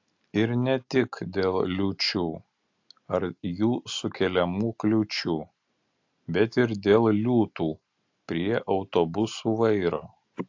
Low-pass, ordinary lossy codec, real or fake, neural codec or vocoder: 7.2 kHz; AAC, 48 kbps; real; none